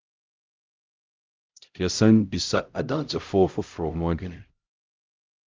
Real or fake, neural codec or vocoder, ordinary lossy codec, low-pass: fake; codec, 16 kHz, 0.5 kbps, X-Codec, HuBERT features, trained on LibriSpeech; Opus, 24 kbps; 7.2 kHz